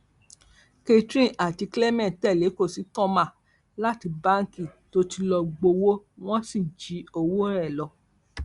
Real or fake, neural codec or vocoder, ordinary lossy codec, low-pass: real; none; Opus, 64 kbps; 10.8 kHz